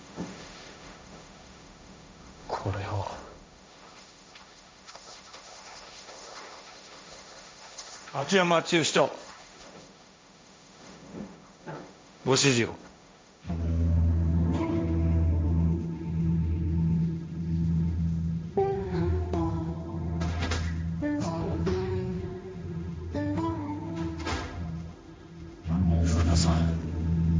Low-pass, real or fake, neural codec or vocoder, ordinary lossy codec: none; fake; codec, 16 kHz, 1.1 kbps, Voila-Tokenizer; none